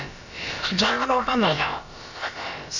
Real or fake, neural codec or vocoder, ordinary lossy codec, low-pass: fake; codec, 16 kHz, about 1 kbps, DyCAST, with the encoder's durations; none; 7.2 kHz